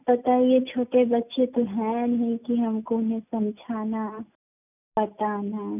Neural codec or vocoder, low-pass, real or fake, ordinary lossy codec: none; 3.6 kHz; real; none